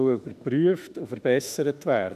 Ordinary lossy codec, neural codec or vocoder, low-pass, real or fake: none; autoencoder, 48 kHz, 32 numbers a frame, DAC-VAE, trained on Japanese speech; 14.4 kHz; fake